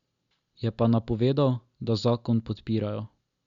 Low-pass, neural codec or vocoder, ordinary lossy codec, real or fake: 7.2 kHz; none; Opus, 64 kbps; real